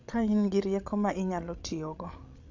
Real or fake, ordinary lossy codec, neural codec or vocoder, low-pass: fake; AAC, 48 kbps; codec, 16 kHz, 16 kbps, FreqCodec, larger model; 7.2 kHz